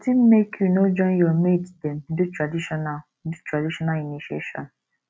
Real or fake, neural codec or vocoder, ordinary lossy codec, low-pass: real; none; none; none